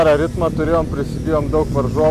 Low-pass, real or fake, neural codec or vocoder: 14.4 kHz; real; none